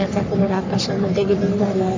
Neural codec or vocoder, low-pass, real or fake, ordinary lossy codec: codec, 44.1 kHz, 3.4 kbps, Pupu-Codec; 7.2 kHz; fake; MP3, 48 kbps